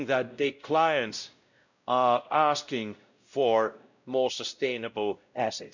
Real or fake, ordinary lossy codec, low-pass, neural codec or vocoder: fake; none; 7.2 kHz; codec, 16 kHz, 0.5 kbps, X-Codec, WavLM features, trained on Multilingual LibriSpeech